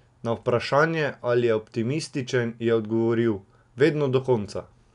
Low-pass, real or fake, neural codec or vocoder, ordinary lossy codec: 10.8 kHz; real; none; none